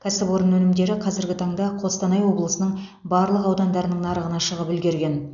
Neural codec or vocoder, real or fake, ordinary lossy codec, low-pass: none; real; none; 7.2 kHz